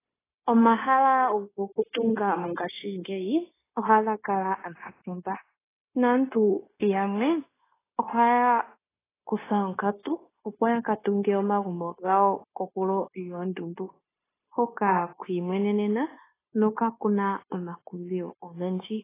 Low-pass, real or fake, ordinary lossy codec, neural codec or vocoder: 3.6 kHz; fake; AAC, 16 kbps; codec, 16 kHz, 0.9 kbps, LongCat-Audio-Codec